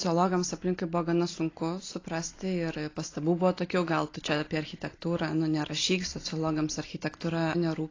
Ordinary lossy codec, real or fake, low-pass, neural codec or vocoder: AAC, 32 kbps; real; 7.2 kHz; none